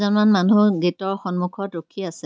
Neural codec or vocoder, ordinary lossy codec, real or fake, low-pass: none; none; real; none